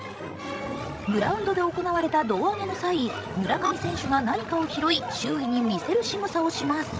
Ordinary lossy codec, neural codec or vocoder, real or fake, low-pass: none; codec, 16 kHz, 16 kbps, FreqCodec, larger model; fake; none